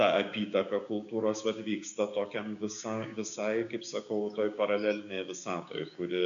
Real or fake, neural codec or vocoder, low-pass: fake; codec, 16 kHz, 6 kbps, DAC; 7.2 kHz